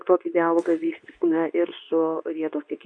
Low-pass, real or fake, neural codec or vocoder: 9.9 kHz; fake; codec, 24 kHz, 0.9 kbps, WavTokenizer, medium speech release version 2